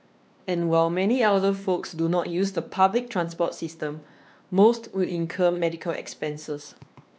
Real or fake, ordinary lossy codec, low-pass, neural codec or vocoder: fake; none; none; codec, 16 kHz, 2 kbps, X-Codec, WavLM features, trained on Multilingual LibriSpeech